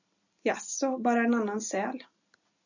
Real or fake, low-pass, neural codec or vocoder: real; 7.2 kHz; none